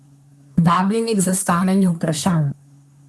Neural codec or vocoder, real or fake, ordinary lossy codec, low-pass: codec, 24 kHz, 1 kbps, SNAC; fake; none; none